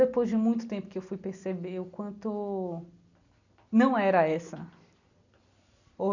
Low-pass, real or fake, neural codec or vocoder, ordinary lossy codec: 7.2 kHz; real; none; none